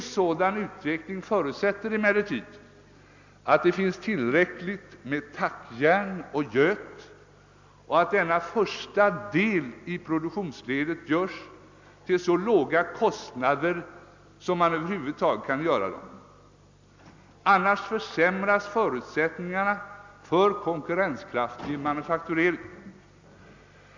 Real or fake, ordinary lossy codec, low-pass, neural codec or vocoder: real; MP3, 64 kbps; 7.2 kHz; none